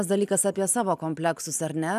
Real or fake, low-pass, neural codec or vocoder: real; 14.4 kHz; none